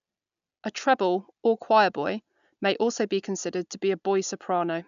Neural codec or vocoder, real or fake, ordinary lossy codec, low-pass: none; real; none; 7.2 kHz